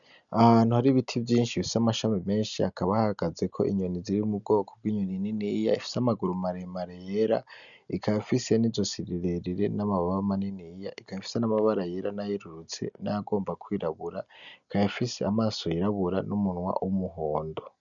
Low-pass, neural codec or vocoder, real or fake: 7.2 kHz; none; real